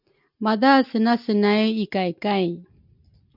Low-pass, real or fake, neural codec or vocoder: 5.4 kHz; real; none